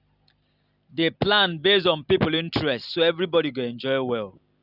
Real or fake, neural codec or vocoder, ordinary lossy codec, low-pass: real; none; none; 5.4 kHz